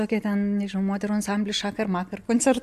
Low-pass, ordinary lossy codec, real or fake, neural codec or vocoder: 14.4 kHz; AAC, 96 kbps; real; none